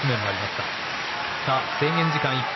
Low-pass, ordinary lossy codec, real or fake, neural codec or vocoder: 7.2 kHz; MP3, 24 kbps; real; none